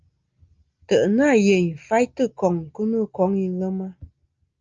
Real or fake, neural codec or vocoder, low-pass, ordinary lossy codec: real; none; 7.2 kHz; Opus, 24 kbps